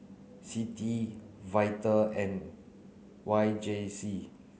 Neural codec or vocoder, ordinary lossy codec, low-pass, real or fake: none; none; none; real